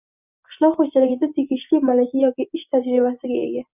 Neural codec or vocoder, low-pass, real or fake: none; 3.6 kHz; real